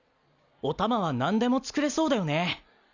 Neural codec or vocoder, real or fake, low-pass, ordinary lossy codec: none; real; 7.2 kHz; none